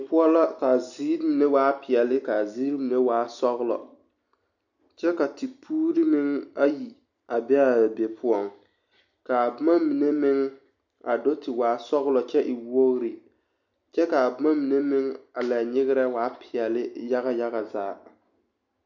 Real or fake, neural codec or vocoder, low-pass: real; none; 7.2 kHz